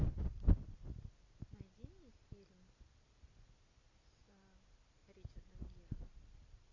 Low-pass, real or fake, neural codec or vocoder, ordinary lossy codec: 7.2 kHz; real; none; AAC, 32 kbps